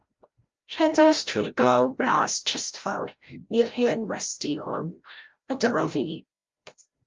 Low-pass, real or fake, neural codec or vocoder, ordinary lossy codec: 7.2 kHz; fake; codec, 16 kHz, 0.5 kbps, FreqCodec, larger model; Opus, 32 kbps